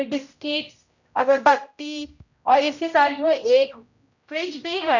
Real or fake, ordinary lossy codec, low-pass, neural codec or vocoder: fake; none; 7.2 kHz; codec, 16 kHz, 0.5 kbps, X-Codec, HuBERT features, trained on general audio